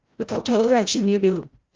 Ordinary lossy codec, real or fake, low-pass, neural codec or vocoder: Opus, 24 kbps; fake; 7.2 kHz; codec, 16 kHz, 0.5 kbps, FreqCodec, larger model